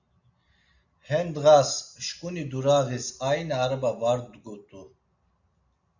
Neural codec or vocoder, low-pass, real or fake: none; 7.2 kHz; real